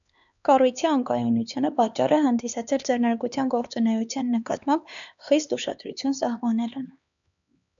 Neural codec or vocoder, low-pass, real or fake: codec, 16 kHz, 2 kbps, X-Codec, HuBERT features, trained on LibriSpeech; 7.2 kHz; fake